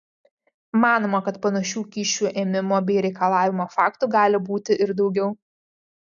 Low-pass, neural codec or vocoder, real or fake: 7.2 kHz; none; real